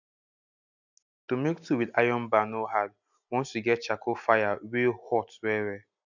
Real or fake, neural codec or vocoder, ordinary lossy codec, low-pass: real; none; none; 7.2 kHz